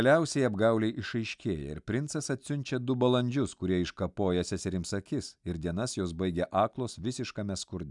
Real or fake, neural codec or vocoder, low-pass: real; none; 10.8 kHz